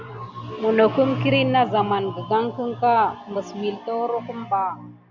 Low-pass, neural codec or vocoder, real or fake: 7.2 kHz; none; real